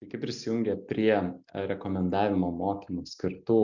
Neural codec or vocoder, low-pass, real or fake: none; 7.2 kHz; real